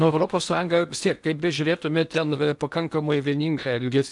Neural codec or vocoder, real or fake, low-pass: codec, 16 kHz in and 24 kHz out, 0.8 kbps, FocalCodec, streaming, 65536 codes; fake; 10.8 kHz